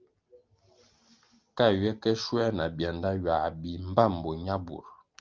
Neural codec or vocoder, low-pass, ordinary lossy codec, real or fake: none; 7.2 kHz; Opus, 32 kbps; real